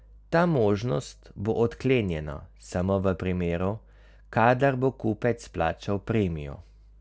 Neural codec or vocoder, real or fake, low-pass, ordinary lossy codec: none; real; none; none